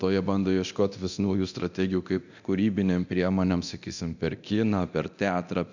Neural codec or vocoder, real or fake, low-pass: codec, 24 kHz, 0.9 kbps, DualCodec; fake; 7.2 kHz